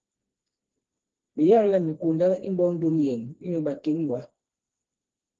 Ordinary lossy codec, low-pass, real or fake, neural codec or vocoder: Opus, 16 kbps; 7.2 kHz; fake; codec, 16 kHz, 2 kbps, FreqCodec, smaller model